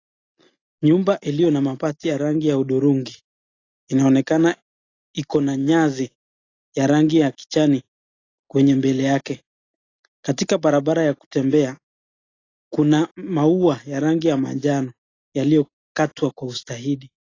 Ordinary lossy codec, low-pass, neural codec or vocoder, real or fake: AAC, 32 kbps; 7.2 kHz; none; real